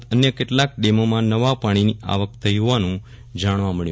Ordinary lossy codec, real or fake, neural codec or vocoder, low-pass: none; real; none; none